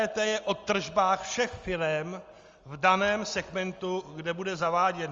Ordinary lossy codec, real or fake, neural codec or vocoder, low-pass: Opus, 32 kbps; real; none; 7.2 kHz